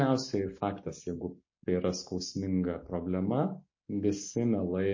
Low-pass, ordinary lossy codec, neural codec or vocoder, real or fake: 7.2 kHz; MP3, 32 kbps; none; real